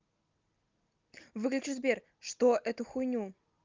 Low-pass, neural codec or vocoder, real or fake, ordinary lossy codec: 7.2 kHz; none; real; Opus, 32 kbps